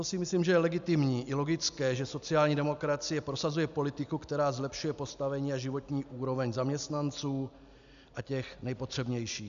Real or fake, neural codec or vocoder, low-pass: real; none; 7.2 kHz